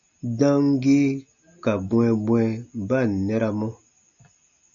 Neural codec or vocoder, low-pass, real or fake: none; 7.2 kHz; real